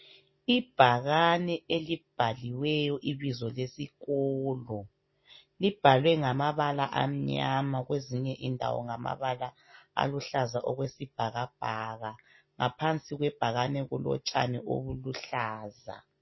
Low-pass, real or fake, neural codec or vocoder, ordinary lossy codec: 7.2 kHz; real; none; MP3, 24 kbps